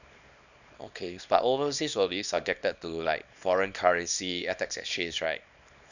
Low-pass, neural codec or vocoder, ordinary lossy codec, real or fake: 7.2 kHz; codec, 24 kHz, 0.9 kbps, WavTokenizer, small release; none; fake